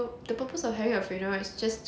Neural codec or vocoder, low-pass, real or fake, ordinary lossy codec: none; none; real; none